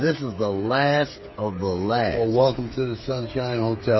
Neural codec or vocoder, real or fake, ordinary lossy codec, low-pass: codec, 16 kHz, 8 kbps, FreqCodec, smaller model; fake; MP3, 24 kbps; 7.2 kHz